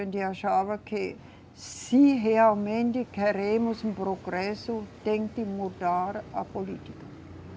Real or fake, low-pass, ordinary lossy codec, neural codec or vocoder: real; none; none; none